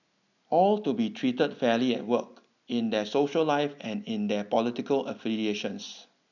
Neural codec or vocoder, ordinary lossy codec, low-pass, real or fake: none; none; 7.2 kHz; real